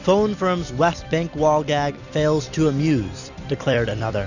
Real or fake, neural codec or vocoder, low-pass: fake; vocoder, 44.1 kHz, 128 mel bands every 256 samples, BigVGAN v2; 7.2 kHz